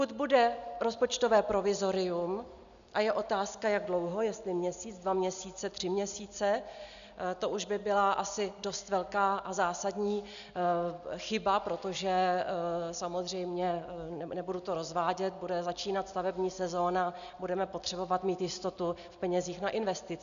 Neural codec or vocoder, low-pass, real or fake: none; 7.2 kHz; real